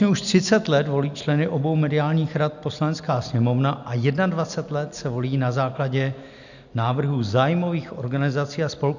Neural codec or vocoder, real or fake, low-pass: none; real; 7.2 kHz